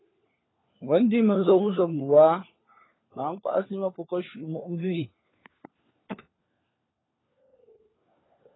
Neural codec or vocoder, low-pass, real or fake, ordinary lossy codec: codec, 16 kHz, 4 kbps, FunCodec, trained on LibriTTS, 50 frames a second; 7.2 kHz; fake; AAC, 16 kbps